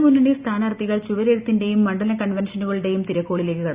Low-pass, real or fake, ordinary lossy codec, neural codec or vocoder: 3.6 kHz; real; Opus, 64 kbps; none